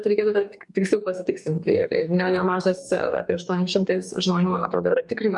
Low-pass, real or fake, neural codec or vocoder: 10.8 kHz; fake; codec, 44.1 kHz, 2.6 kbps, DAC